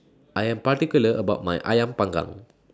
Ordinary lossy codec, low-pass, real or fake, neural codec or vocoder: none; none; real; none